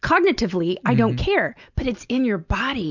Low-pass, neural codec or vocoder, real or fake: 7.2 kHz; none; real